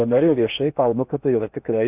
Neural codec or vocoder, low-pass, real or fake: codec, 16 kHz in and 24 kHz out, 0.8 kbps, FocalCodec, streaming, 65536 codes; 3.6 kHz; fake